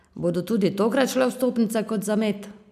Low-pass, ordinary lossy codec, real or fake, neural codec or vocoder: 14.4 kHz; none; real; none